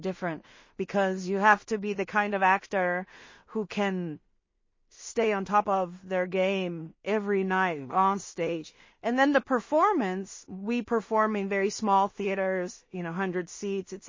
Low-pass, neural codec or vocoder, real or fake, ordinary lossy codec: 7.2 kHz; codec, 16 kHz in and 24 kHz out, 0.4 kbps, LongCat-Audio-Codec, two codebook decoder; fake; MP3, 32 kbps